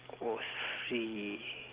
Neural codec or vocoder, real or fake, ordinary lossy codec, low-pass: none; real; Opus, 16 kbps; 3.6 kHz